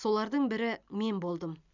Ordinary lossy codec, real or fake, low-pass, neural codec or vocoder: none; real; 7.2 kHz; none